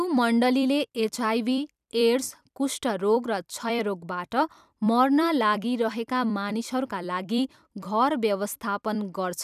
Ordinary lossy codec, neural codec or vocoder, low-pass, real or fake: none; vocoder, 44.1 kHz, 128 mel bands every 256 samples, BigVGAN v2; 14.4 kHz; fake